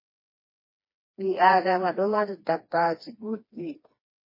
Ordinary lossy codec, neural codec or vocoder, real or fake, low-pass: MP3, 24 kbps; codec, 16 kHz, 2 kbps, FreqCodec, smaller model; fake; 5.4 kHz